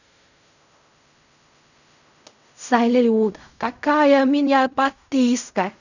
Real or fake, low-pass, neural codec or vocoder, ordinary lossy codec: fake; 7.2 kHz; codec, 16 kHz in and 24 kHz out, 0.4 kbps, LongCat-Audio-Codec, fine tuned four codebook decoder; none